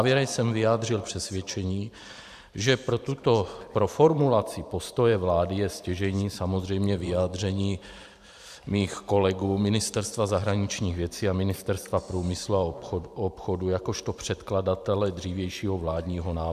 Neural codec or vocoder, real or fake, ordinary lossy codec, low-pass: vocoder, 44.1 kHz, 128 mel bands every 512 samples, BigVGAN v2; fake; AAC, 96 kbps; 14.4 kHz